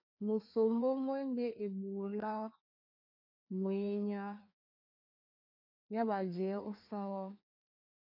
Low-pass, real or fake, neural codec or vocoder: 5.4 kHz; fake; codec, 16 kHz, 2 kbps, FreqCodec, larger model